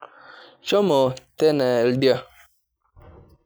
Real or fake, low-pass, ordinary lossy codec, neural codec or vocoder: real; none; none; none